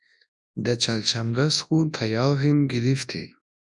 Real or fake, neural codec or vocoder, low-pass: fake; codec, 24 kHz, 0.9 kbps, WavTokenizer, large speech release; 10.8 kHz